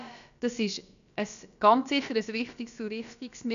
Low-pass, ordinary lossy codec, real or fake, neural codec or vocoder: 7.2 kHz; none; fake; codec, 16 kHz, about 1 kbps, DyCAST, with the encoder's durations